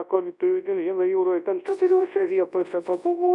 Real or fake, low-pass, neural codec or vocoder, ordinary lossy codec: fake; 10.8 kHz; codec, 24 kHz, 0.9 kbps, WavTokenizer, large speech release; MP3, 96 kbps